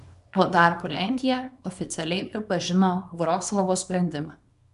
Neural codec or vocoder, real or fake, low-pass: codec, 24 kHz, 0.9 kbps, WavTokenizer, small release; fake; 10.8 kHz